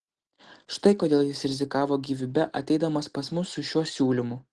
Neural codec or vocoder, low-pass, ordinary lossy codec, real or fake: none; 9.9 kHz; Opus, 16 kbps; real